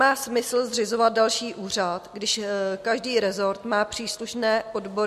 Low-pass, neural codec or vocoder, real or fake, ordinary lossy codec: 14.4 kHz; none; real; MP3, 64 kbps